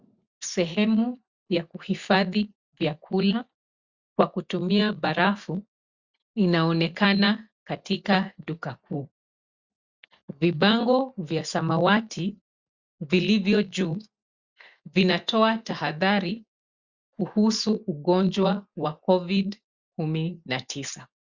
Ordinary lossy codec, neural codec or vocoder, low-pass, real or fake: Opus, 64 kbps; none; 7.2 kHz; real